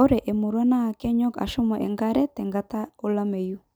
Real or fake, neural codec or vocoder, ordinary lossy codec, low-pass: real; none; none; none